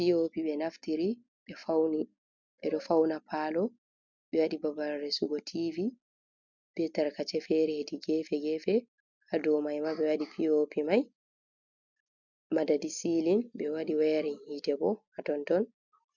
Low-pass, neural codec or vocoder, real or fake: 7.2 kHz; none; real